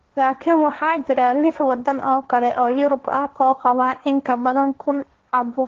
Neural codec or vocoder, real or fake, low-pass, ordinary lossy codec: codec, 16 kHz, 1.1 kbps, Voila-Tokenizer; fake; 7.2 kHz; Opus, 16 kbps